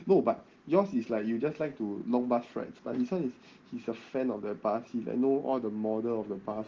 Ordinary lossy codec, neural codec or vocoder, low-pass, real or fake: Opus, 16 kbps; none; 7.2 kHz; real